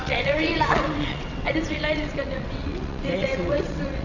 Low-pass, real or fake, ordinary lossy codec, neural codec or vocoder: 7.2 kHz; fake; none; vocoder, 22.05 kHz, 80 mel bands, WaveNeXt